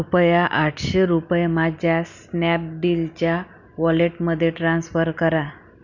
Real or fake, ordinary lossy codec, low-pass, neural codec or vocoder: real; none; 7.2 kHz; none